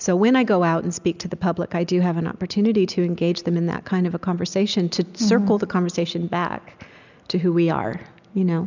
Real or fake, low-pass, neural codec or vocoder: real; 7.2 kHz; none